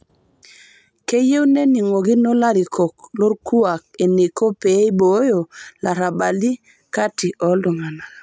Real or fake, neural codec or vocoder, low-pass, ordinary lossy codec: real; none; none; none